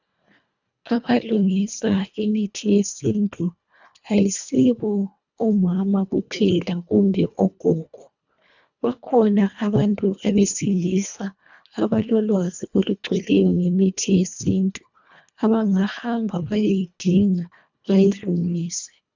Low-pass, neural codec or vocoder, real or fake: 7.2 kHz; codec, 24 kHz, 1.5 kbps, HILCodec; fake